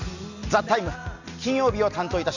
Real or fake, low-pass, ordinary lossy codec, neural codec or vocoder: real; 7.2 kHz; none; none